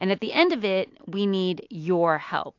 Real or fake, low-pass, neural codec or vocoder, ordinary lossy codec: real; 7.2 kHz; none; AAC, 48 kbps